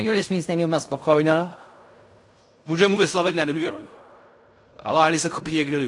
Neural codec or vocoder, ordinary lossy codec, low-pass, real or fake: codec, 16 kHz in and 24 kHz out, 0.4 kbps, LongCat-Audio-Codec, fine tuned four codebook decoder; AAC, 48 kbps; 10.8 kHz; fake